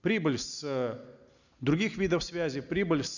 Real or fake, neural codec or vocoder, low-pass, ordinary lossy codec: real; none; 7.2 kHz; none